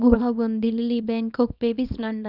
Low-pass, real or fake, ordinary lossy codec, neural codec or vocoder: 5.4 kHz; fake; none; codec, 24 kHz, 0.9 kbps, WavTokenizer, small release